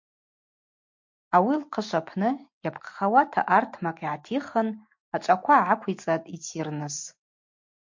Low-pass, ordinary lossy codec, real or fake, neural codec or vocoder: 7.2 kHz; MP3, 48 kbps; real; none